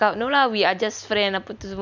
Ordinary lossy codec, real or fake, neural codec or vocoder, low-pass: none; real; none; 7.2 kHz